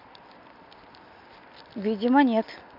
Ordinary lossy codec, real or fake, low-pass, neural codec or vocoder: MP3, 48 kbps; real; 5.4 kHz; none